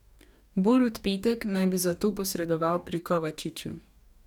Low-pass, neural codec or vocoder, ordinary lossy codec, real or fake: 19.8 kHz; codec, 44.1 kHz, 2.6 kbps, DAC; none; fake